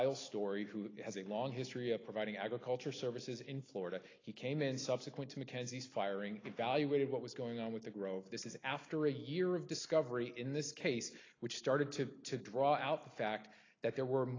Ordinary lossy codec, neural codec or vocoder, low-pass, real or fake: AAC, 32 kbps; none; 7.2 kHz; real